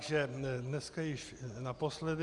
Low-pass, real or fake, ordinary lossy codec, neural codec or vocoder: 10.8 kHz; real; Opus, 64 kbps; none